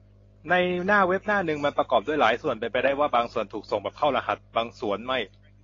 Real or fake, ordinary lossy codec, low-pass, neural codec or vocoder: real; AAC, 32 kbps; 7.2 kHz; none